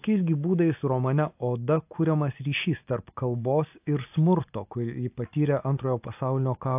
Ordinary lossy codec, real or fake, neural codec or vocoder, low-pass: AAC, 32 kbps; real; none; 3.6 kHz